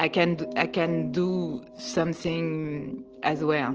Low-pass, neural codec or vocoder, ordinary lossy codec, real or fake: 7.2 kHz; none; Opus, 16 kbps; real